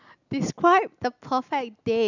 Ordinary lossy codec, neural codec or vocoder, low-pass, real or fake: none; none; 7.2 kHz; real